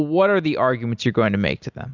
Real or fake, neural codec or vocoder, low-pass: real; none; 7.2 kHz